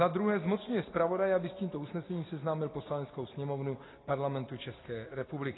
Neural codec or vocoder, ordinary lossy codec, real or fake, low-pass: none; AAC, 16 kbps; real; 7.2 kHz